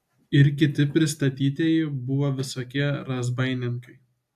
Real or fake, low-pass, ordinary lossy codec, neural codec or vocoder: real; 14.4 kHz; AAC, 96 kbps; none